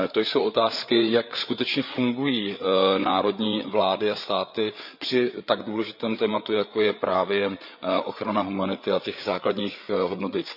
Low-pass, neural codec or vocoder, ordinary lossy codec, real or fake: 5.4 kHz; codec, 16 kHz, 8 kbps, FreqCodec, larger model; none; fake